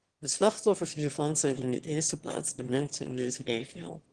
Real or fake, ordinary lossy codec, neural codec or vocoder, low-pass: fake; Opus, 16 kbps; autoencoder, 22.05 kHz, a latent of 192 numbers a frame, VITS, trained on one speaker; 9.9 kHz